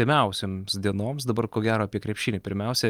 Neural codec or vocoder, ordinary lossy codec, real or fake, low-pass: none; Opus, 32 kbps; real; 19.8 kHz